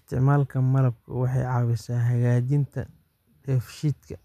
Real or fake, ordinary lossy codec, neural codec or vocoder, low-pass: real; none; none; 14.4 kHz